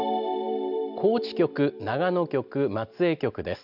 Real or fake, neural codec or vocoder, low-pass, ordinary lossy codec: real; none; 5.4 kHz; none